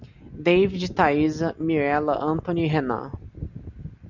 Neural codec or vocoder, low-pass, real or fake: none; 7.2 kHz; real